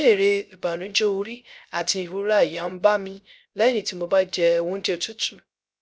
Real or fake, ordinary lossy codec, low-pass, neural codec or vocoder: fake; none; none; codec, 16 kHz, 0.3 kbps, FocalCodec